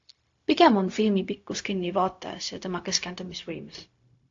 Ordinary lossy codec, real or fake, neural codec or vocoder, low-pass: AAC, 48 kbps; fake; codec, 16 kHz, 0.4 kbps, LongCat-Audio-Codec; 7.2 kHz